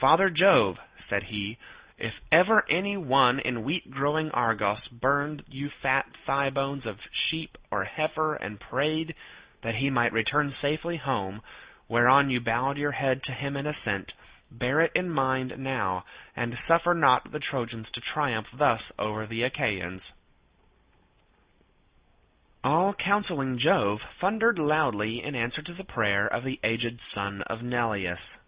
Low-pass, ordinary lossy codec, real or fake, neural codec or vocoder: 3.6 kHz; Opus, 64 kbps; real; none